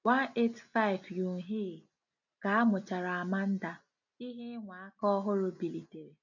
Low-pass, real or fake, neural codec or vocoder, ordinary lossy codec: 7.2 kHz; real; none; none